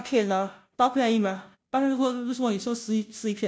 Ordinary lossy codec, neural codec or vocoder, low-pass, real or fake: none; codec, 16 kHz, 0.5 kbps, FunCodec, trained on Chinese and English, 25 frames a second; none; fake